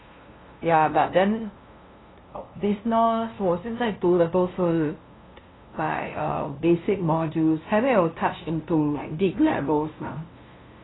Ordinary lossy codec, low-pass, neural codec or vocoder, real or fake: AAC, 16 kbps; 7.2 kHz; codec, 16 kHz, 0.5 kbps, FunCodec, trained on LibriTTS, 25 frames a second; fake